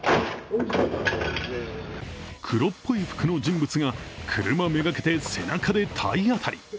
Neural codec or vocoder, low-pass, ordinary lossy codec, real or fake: none; none; none; real